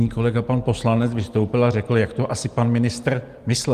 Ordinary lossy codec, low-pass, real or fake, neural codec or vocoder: Opus, 24 kbps; 14.4 kHz; real; none